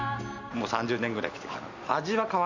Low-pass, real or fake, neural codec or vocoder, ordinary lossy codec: 7.2 kHz; real; none; none